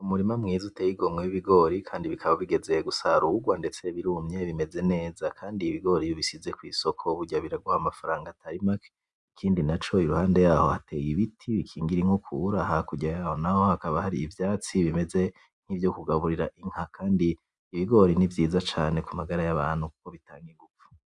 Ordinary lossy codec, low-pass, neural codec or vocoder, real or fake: Opus, 64 kbps; 10.8 kHz; none; real